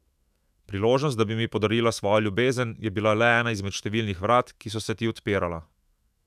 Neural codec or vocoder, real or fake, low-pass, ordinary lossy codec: autoencoder, 48 kHz, 128 numbers a frame, DAC-VAE, trained on Japanese speech; fake; 14.4 kHz; none